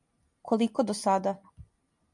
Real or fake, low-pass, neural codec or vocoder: real; 10.8 kHz; none